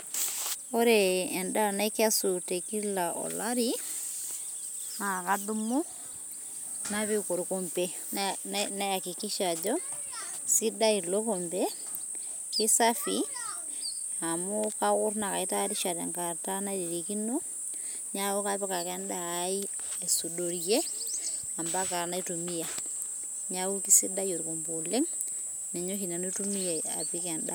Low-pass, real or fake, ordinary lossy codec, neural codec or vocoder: none; real; none; none